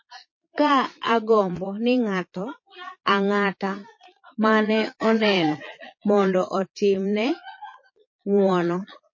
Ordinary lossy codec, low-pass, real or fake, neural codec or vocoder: MP3, 32 kbps; 7.2 kHz; fake; vocoder, 22.05 kHz, 80 mel bands, Vocos